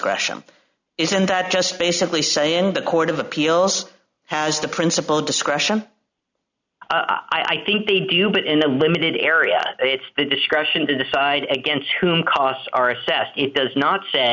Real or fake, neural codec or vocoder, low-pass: real; none; 7.2 kHz